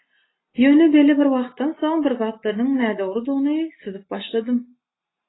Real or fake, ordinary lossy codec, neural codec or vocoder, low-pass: real; AAC, 16 kbps; none; 7.2 kHz